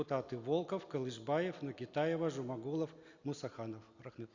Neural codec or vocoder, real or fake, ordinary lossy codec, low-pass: none; real; Opus, 64 kbps; 7.2 kHz